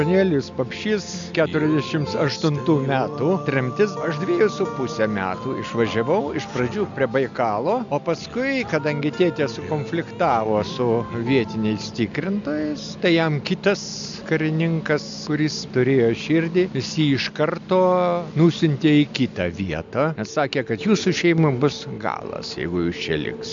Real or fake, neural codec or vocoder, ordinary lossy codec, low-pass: real; none; MP3, 64 kbps; 7.2 kHz